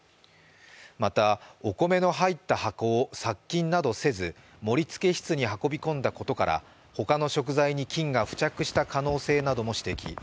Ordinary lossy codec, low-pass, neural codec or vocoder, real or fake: none; none; none; real